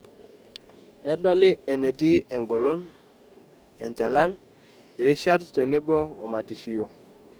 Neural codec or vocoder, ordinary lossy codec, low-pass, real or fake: codec, 44.1 kHz, 2.6 kbps, DAC; none; none; fake